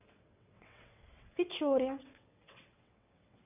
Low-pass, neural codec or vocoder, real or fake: 3.6 kHz; vocoder, 22.05 kHz, 80 mel bands, WaveNeXt; fake